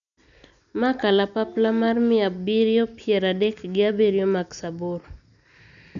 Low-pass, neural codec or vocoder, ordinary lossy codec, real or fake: 7.2 kHz; none; none; real